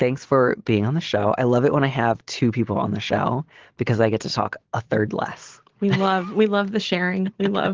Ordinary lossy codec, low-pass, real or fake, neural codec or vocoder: Opus, 16 kbps; 7.2 kHz; real; none